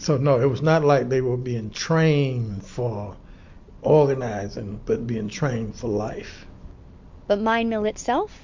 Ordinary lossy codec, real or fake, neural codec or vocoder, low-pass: MP3, 48 kbps; real; none; 7.2 kHz